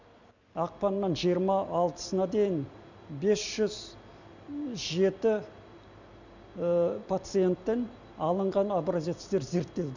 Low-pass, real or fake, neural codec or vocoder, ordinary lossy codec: 7.2 kHz; real; none; none